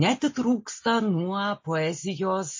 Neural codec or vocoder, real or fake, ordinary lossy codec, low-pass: none; real; MP3, 32 kbps; 7.2 kHz